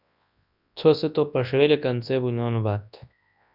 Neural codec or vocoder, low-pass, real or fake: codec, 24 kHz, 0.9 kbps, WavTokenizer, large speech release; 5.4 kHz; fake